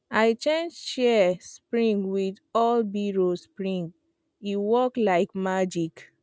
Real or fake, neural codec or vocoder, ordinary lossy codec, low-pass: real; none; none; none